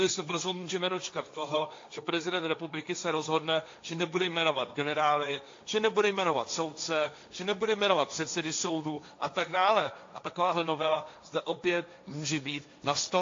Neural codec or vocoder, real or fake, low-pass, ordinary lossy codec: codec, 16 kHz, 1.1 kbps, Voila-Tokenizer; fake; 7.2 kHz; AAC, 48 kbps